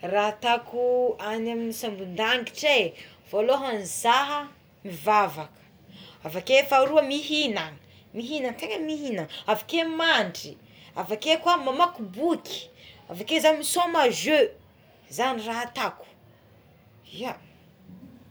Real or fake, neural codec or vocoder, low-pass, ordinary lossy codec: real; none; none; none